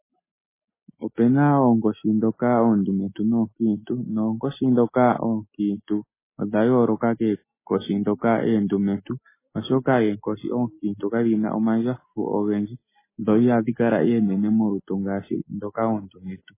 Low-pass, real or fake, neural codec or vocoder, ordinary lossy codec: 3.6 kHz; real; none; MP3, 16 kbps